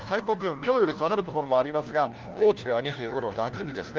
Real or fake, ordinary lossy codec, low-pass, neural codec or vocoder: fake; Opus, 32 kbps; 7.2 kHz; codec, 16 kHz, 1 kbps, FunCodec, trained on LibriTTS, 50 frames a second